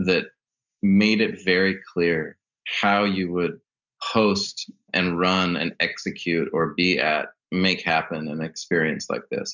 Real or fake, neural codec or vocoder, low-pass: real; none; 7.2 kHz